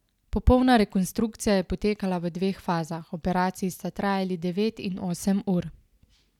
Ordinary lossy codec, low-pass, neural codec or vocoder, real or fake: none; 19.8 kHz; none; real